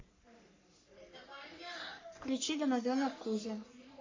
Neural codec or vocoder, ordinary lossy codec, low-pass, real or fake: codec, 44.1 kHz, 3.4 kbps, Pupu-Codec; AAC, 32 kbps; 7.2 kHz; fake